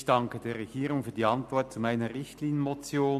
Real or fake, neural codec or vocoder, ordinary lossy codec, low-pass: real; none; none; 14.4 kHz